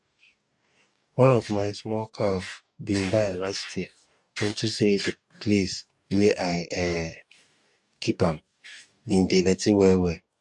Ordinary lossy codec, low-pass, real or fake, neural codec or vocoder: none; 10.8 kHz; fake; codec, 44.1 kHz, 2.6 kbps, DAC